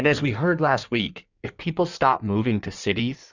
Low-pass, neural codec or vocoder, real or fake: 7.2 kHz; codec, 16 kHz in and 24 kHz out, 1.1 kbps, FireRedTTS-2 codec; fake